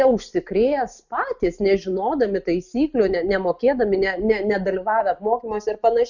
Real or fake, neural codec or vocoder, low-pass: real; none; 7.2 kHz